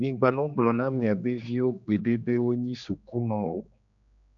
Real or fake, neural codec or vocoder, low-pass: fake; codec, 16 kHz, 2 kbps, X-Codec, HuBERT features, trained on general audio; 7.2 kHz